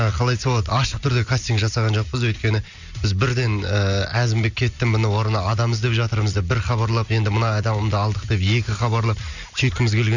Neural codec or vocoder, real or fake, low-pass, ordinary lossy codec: none; real; 7.2 kHz; none